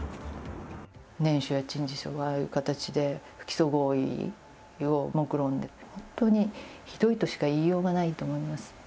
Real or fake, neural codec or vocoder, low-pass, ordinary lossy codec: real; none; none; none